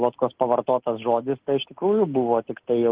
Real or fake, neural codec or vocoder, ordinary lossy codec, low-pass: real; none; Opus, 16 kbps; 3.6 kHz